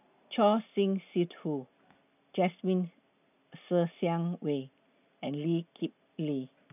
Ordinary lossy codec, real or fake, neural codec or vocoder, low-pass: none; real; none; 3.6 kHz